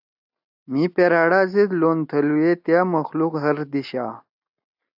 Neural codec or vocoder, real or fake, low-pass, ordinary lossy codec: none; real; 5.4 kHz; AAC, 48 kbps